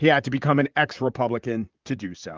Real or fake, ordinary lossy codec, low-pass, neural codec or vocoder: fake; Opus, 24 kbps; 7.2 kHz; vocoder, 44.1 kHz, 128 mel bands, Pupu-Vocoder